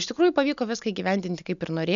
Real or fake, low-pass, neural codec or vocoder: real; 7.2 kHz; none